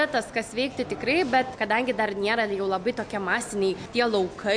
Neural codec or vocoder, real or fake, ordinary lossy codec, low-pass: none; real; MP3, 64 kbps; 9.9 kHz